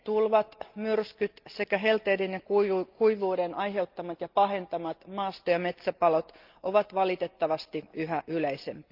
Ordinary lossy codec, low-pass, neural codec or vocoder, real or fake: Opus, 24 kbps; 5.4 kHz; none; real